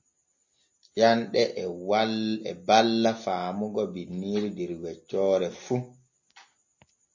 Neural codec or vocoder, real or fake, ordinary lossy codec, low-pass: none; real; MP3, 32 kbps; 7.2 kHz